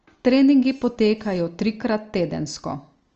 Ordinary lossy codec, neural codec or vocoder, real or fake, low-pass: Opus, 24 kbps; none; real; 7.2 kHz